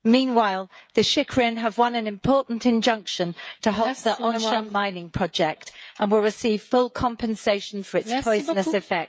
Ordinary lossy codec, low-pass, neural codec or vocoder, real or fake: none; none; codec, 16 kHz, 16 kbps, FreqCodec, smaller model; fake